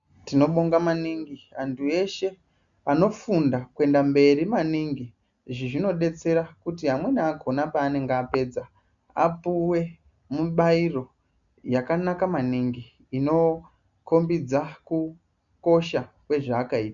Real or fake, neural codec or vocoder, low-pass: real; none; 7.2 kHz